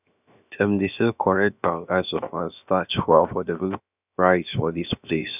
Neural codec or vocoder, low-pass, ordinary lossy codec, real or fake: codec, 16 kHz, 0.7 kbps, FocalCodec; 3.6 kHz; none; fake